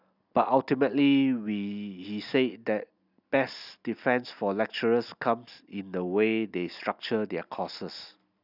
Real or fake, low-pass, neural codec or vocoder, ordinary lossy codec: real; 5.4 kHz; none; none